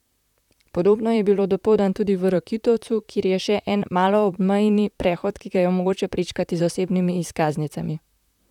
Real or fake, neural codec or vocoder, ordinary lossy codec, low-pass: fake; vocoder, 44.1 kHz, 128 mel bands, Pupu-Vocoder; none; 19.8 kHz